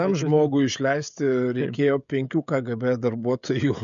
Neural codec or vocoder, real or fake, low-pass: codec, 16 kHz, 16 kbps, FreqCodec, smaller model; fake; 7.2 kHz